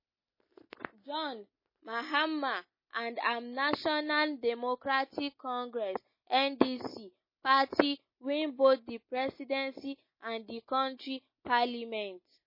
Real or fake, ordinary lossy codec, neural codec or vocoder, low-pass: real; MP3, 24 kbps; none; 5.4 kHz